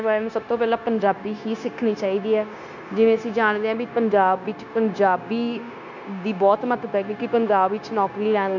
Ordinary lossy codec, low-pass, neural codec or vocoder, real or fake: none; 7.2 kHz; codec, 16 kHz, 0.9 kbps, LongCat-Audio-Codec; fake